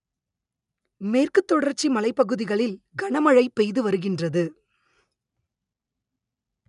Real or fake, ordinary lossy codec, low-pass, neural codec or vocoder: real; none; 10.8 kHz; none